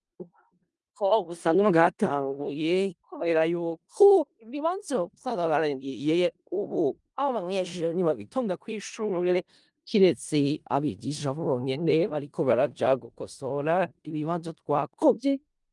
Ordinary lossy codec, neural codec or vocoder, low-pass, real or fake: Opus, 32 kbps; codec, 16 kHz in and 24 kHz out, 0.4 kbps, LongCat-Audio-Codec, four codebook decoder; 10.8 kHz; fake